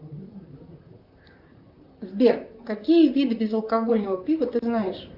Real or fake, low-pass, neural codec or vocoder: fake; 5.4 kHz; vocoder, 44.1 kHz, 128 mel bands, Pupu-Vocoder